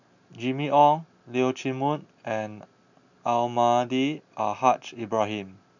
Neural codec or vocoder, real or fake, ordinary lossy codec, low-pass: none; real; none; 7.2 kHz